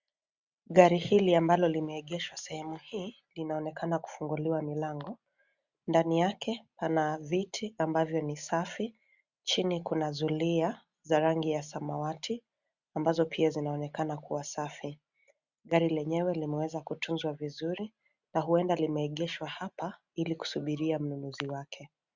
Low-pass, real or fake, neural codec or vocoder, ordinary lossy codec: 7.2 kHz; real; none; Opus, 64 kbps